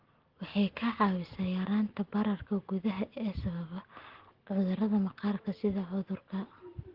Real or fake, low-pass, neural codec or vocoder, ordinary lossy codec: real; 5.4 kHz; none; Opus, 16 kbps